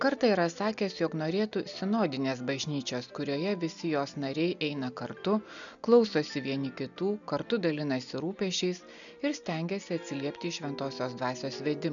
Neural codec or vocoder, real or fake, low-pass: none; real; 7.2 kHz